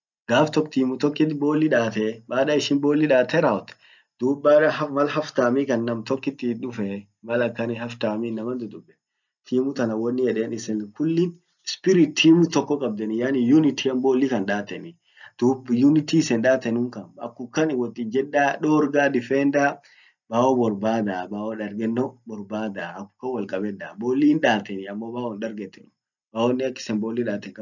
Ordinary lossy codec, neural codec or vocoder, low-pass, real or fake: none; none; 7.2 kHz; real